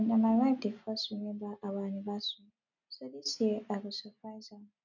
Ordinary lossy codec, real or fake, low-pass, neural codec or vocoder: none; real; 7.2 kHz; none